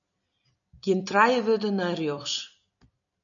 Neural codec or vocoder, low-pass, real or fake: none; 7.2 kHz; real